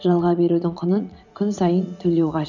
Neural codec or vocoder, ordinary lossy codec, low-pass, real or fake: vocoder, 44.1 kHz, 80 mel bands, Vocos; none; 7.2 kHz; fake